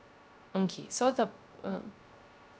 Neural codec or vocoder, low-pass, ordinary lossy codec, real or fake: codec, 16 kHz, 0.3 kbps, FocalCodec; none; none; fake